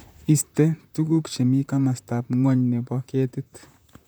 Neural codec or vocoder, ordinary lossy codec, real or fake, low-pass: vocoder, 44.1 kHz, 128 mel bands, Pupu-Vocoder; none; fake; none